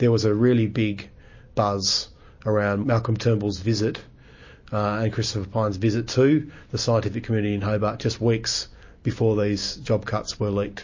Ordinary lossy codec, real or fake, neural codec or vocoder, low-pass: MP3, 32 kbps; real; none; 7.2 kHz